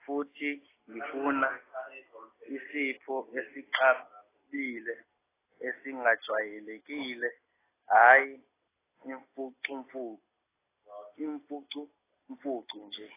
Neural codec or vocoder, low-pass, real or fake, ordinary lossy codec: none; 3.6 kHz; real; AAC, 16 kbps